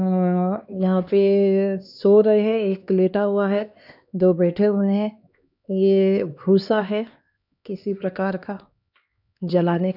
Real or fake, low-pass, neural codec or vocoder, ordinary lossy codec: fake; 5.4 kHz; codec, 16 kHz, 2 kbps, X-Codec, HuBERT features, trained on LibriSpeech; none